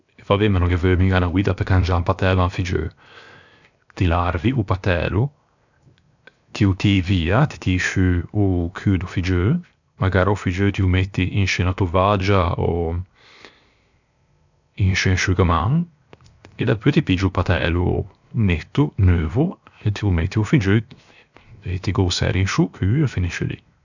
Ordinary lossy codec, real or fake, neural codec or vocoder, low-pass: none; fake; codec, 16 kHz, 0.7 kbps, FocalCodec; 7.2 kHz